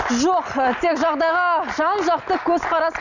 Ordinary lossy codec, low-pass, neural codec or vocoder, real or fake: none; 7.2 kHz; none; real